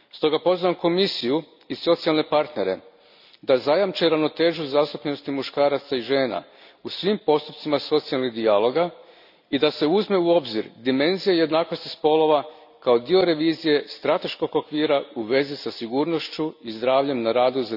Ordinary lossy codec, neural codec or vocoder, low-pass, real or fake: none; none; 5.4 kHz; real